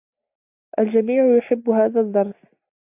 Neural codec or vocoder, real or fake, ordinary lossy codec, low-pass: none; real; AAC, 32 kbps; 3.6 kHz